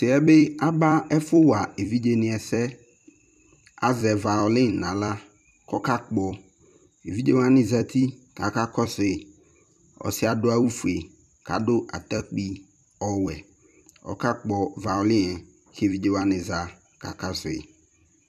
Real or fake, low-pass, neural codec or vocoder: fake; 14.4 kHz; vocoder, 44.1 kHz, 128 mel bands every 256 samples, BigVGAN v2